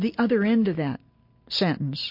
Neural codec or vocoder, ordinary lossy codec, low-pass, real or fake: none; MP3, 32 kbps; 5.4 kHz; real